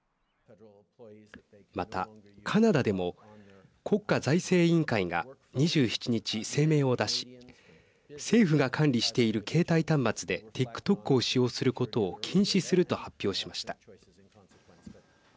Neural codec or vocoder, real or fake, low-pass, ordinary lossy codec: none; real; none; none